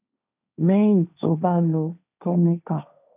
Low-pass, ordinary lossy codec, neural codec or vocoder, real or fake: 3.6 kHz; none; codec, 16 kHz, 1.1 kbps, Voila-Tokenizer; fake